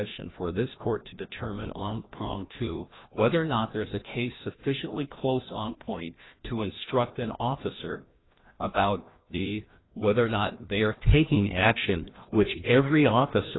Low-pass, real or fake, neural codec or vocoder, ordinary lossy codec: 7.2 kHz; fake; codec, 16 kHz, 1 kbps, FreqCodec, larger model; AAC, 16 kbps